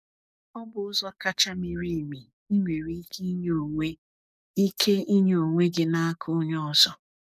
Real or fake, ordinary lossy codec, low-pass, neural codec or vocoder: fake; none; 14.4 kHz; codec, 44.1 kHz, 7.8 kbps, DAC